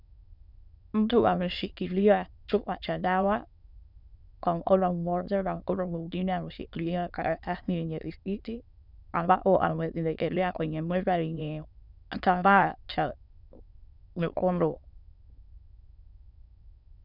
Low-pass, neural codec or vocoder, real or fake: 5.4 kHz; autoencoder, 22.05 kHz, a latent of 192 numbers a frame, VITS, trained on many speakers; fake